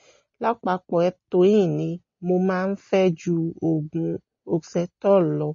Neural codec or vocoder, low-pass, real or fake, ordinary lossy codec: none; 7.2 kHz; real; MP3, 32 kbps